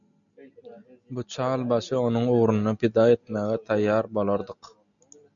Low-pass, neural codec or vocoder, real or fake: 7.2 kHz; none; real